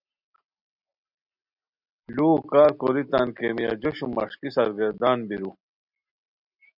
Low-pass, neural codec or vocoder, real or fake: 5.4 kHz; none; real